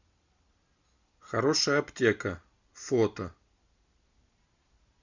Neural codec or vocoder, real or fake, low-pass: none; real; 7.2 kHz